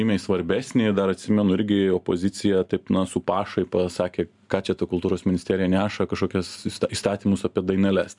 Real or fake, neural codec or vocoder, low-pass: real; none; 10.8 kHz